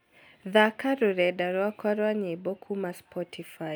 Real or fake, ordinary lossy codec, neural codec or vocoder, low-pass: real; none; none; none